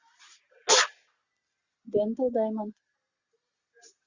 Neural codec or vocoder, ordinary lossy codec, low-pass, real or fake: none; Opus, 64 kbps; 7.2 kHz; real